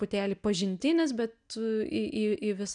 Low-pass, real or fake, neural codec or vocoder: 9.9 kHz; real; none